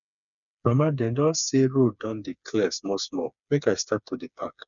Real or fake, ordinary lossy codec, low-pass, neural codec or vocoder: fake; none; 7.2 kHz; codec, 16 kHz, 4 kbps, FreqCodec, smaller model